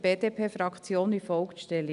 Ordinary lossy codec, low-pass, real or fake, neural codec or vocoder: none; 10.8 kHz; real; none